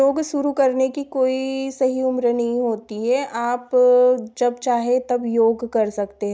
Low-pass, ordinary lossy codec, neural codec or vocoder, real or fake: none; none; none; real